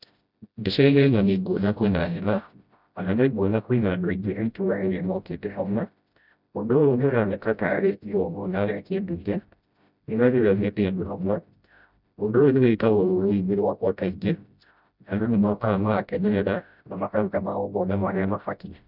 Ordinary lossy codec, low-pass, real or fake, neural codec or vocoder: none; 5.4 kHz; fake; codec, 16 kHz, 0.5 kbps, FreqCodec, smaller model